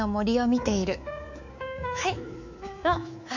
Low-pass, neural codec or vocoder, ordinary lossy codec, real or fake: 7.2 kHz; codec, 24 kHz, 3.1 kbps, DualCodec; none; fake